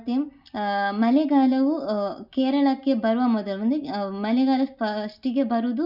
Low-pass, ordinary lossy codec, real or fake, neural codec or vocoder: 5.4 kHz; none; real; none